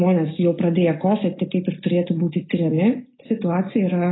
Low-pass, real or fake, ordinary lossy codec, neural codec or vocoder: 7.2 kHz; real; AAC, 16 kbps; none